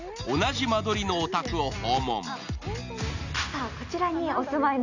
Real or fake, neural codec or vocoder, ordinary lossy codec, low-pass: real; none; none; 7.2 kHz